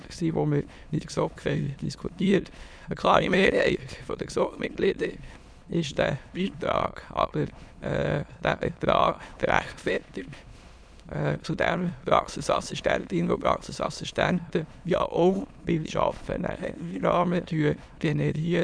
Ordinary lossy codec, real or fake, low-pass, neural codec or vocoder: none; fake; none; autoencoder, 22.05 kHz, a latent of 192 numbers a frame, VITS, trained on many speakers